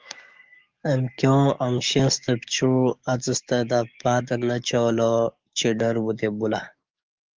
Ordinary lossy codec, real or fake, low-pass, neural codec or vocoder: Opus, 24 kbps; fake; 7.2 kHz; codec, 44.1 kHz, 7.8 kbps, DAC